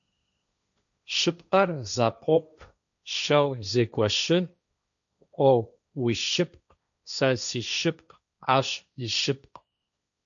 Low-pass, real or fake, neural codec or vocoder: 7.2 kHz; fake; codec, 16 kHz, 1.1 kbps, Voila-Tokenizer